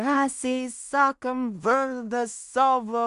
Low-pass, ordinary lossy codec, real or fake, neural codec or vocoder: 10.8 kHz; MP3, 96 kbps; fake; codec, 16 kHz in and 24 kHz out, 0.4 kbps, LongCat-Audio-Codec, two codebook decoder